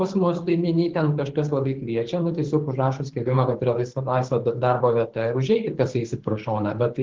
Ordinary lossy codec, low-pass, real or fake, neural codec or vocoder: Opus, 16 kbps; 7.2 kHz; fake; codec, 24 kHz, 6 kbps, HILCodec